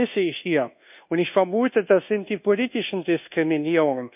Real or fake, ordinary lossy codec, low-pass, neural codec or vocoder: fake; none; 3.6 kHz; codec, 24 kHz, 1.2 kbps, DualCodec